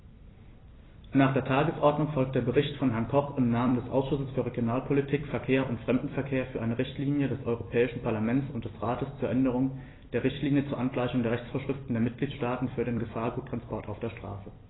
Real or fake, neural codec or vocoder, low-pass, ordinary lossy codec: fake; vocoder, 44.1 kHz, 128 mel bands every 256 samples, BigVGAN v2; 7.2 kHz; AAC, 16 kbps